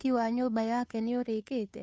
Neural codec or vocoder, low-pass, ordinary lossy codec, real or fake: codec, 16 kHz, 2 kbps, FunCodec, trained on Chinese and English, 25 frames a second; none; none; fake